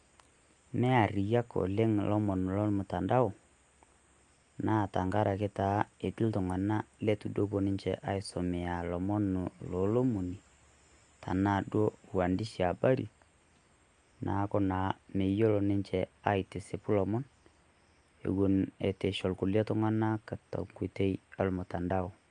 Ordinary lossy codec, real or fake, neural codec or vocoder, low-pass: Opus, 32 kbps; real; none; 9.9 kHz